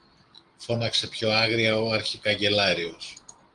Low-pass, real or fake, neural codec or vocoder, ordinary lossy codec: 9.9 kHz; real; none; Opus, 24 kbps